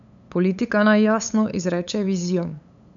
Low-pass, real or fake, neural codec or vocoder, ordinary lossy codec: 7.2 kHz; fake; codec, 16 kHz, 8 kbps, FunCodec, trained on LibriTTS, 25 frames a second; none